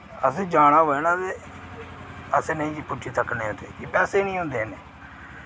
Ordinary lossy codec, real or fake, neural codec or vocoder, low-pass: none; real; none; none